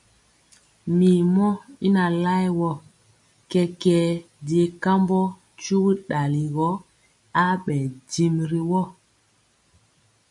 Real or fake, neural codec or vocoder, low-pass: real; none; 10.8 kHz